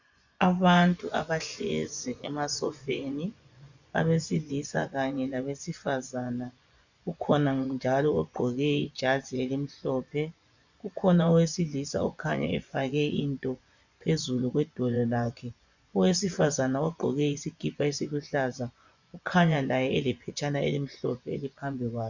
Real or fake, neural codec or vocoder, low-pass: fake; vocoder, 24 kHz, 100 mel bands, Vocos; 7.2 kHz